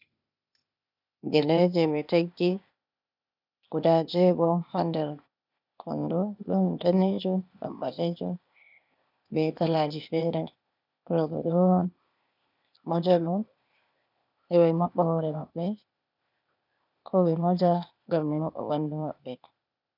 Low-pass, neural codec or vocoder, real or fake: 5.4 kHz; codec, 16 kHz, 0.8 kbps, ZipCodec; fake